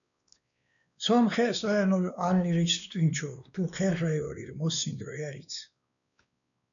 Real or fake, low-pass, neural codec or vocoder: fake; 7.2 kHz; codec, 16 kHz, 2 kbps, X-Codec, WavLM features, trained on Multilingual LibriSpeech